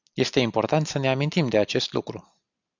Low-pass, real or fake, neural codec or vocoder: 7.2 kHz; real; none